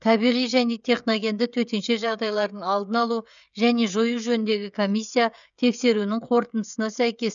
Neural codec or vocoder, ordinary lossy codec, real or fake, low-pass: codec, 16 kHz, 16 kbps, FreqCodec, smaller model; none; fake; 7.2 kHz